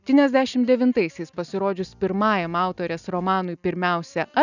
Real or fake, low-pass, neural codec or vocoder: real; 7.2 kHz; none